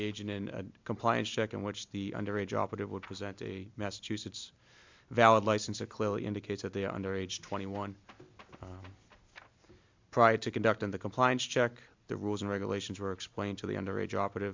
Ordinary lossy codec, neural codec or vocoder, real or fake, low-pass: MP3, 64 kbps; none; real; 7.2 kHz